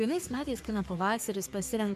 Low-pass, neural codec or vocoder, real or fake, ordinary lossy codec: 14.4 kHz; codec, 44.1 kHz, 3.4 kbps, Pupu-Codec; fake; MP3, 96 kbps